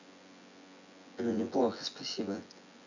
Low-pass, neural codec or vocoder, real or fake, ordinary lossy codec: 7.2 kHz; vocoder, 24 kHz, 100 mel bands, Vocos; fake; none